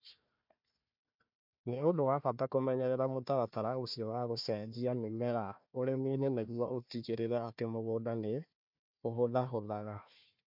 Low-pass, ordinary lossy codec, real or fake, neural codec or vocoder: 5.4 kHz; MP3, 48 kbps; fake; codec, 16 kHz, 1 kbps, FunCodec, trained on Chinese and English, 50 frames a second